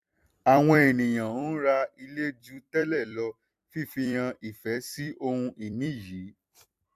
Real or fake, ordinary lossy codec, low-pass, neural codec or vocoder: fake; AAC, 96 kbps; 14.4 kHz; vocoder, 44.1 kHz, 128 mel bands every 256 samples, BigVGAN v2